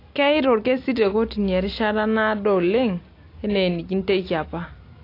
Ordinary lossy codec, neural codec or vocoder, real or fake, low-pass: AAC, 32 kbps; none; real; 5.4 kHz